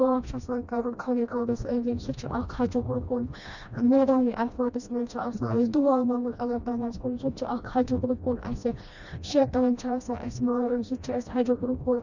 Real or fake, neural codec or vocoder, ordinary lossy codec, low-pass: fake; codec, 16 kHz, 1 kbps, FreqCodec, smaller model; none; 7.2 kHz